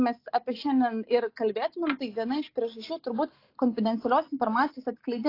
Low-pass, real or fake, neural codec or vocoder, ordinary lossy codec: 5.4 kHz; real; none; AAC, 32 kbps